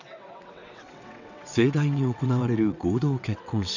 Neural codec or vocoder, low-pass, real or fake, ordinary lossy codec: vocoder, 22.05 kHz, 80 mel bands, WaveNeXt; 7.2 kHz; fake; none